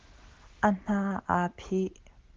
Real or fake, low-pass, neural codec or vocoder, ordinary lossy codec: real; 7.2 kHz; none; Opus, 16 kbps